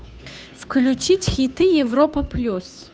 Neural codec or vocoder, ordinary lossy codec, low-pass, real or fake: codec, 16 kHz, 2 kbps, FunCodec, trained on Chinese and English, 25 frames a second; none; none; fake